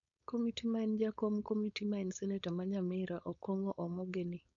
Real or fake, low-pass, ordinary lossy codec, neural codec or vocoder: fake; 7.2 kHz; none; codec, 16 kHz, 4.8 kbps, FACodec